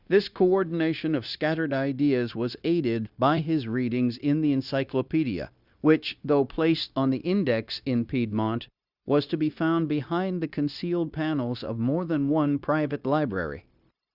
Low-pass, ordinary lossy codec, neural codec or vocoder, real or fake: 5.4 kHz; Opus, 64 kbps; codec, 16 kHz, 0.9 kbps, LongCat-Audio-Codec; fake